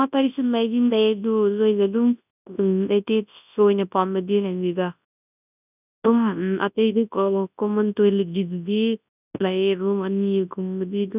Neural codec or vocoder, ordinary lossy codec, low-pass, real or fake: codec, 24 kHz, 0.9 kbps, WavTokenizer, large speech release; none; 3.6 kHz; fake